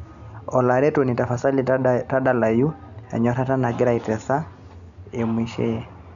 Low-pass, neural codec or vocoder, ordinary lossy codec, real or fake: 7.2 kHz; none; none; real